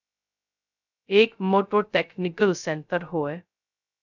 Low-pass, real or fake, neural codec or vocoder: 7.2 kHz; fake; codec, 16 kHz, 0.2 kbps, FocalCodec